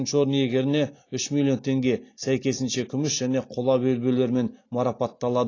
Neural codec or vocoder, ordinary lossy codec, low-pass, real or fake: none; AAC, 32 kbps; 7.2 kHz; real